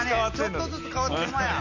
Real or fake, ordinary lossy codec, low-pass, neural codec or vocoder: real; none; 7.2 kHz; none